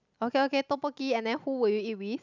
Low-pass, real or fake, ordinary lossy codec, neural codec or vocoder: 7.2 kHz; real; none; none